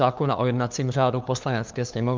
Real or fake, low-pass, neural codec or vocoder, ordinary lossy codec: fake; 7.2 kHz; codec, 16 kHz, 2 kbps, FunCodec, trained on LibriTTS, 25 frames a second; Opus, 24 kbps